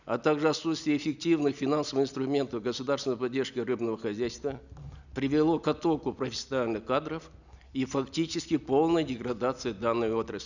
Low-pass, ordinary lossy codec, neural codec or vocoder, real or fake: 7.2 kHz; none; none; real